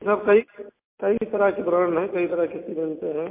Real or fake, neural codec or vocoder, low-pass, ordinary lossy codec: real; none; 3.6 kHz; MP3, 24 kbps